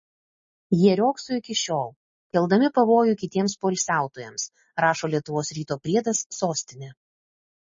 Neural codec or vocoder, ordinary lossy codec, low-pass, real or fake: none; MP3, 32 kbps; 7.2 kHz; real